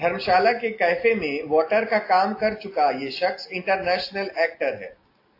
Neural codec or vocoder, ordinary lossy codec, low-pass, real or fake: none; AAC, 32 kbps; 5.4 kHz; real